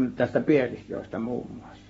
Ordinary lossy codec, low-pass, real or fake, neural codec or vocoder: AAC, 24 kbps; 19.8 kHz; fake; codec, 44.1 kHz, 7.8 kbps, Pupu-Codec